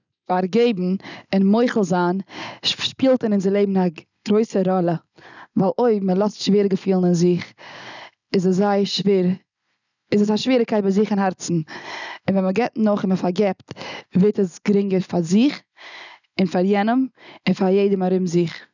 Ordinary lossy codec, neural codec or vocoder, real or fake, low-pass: none; none; real; 7.2 kHz